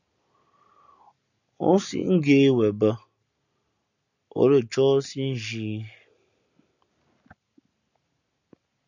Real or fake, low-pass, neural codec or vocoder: real; 7.2 kHz; none